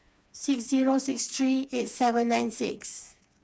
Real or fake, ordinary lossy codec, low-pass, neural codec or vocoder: fake; none; none; codec, 16 kHz, 4 kbps, FreqCodec, smaller model